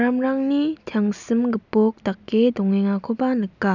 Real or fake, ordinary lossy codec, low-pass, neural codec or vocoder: real; none; 7.2 kHz; none